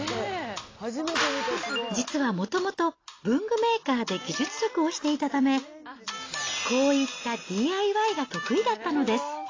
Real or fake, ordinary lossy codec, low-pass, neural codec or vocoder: real; AAC, 32 kbps; 7.2 kHz; none